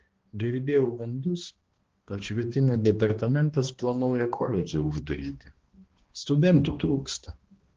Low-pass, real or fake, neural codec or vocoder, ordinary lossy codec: 7.2 kHz; fake; codec, 16 kHz, 1 kbps, X-Codec, HuBERT features, trained on balanced general audio; Opus, 16 kbps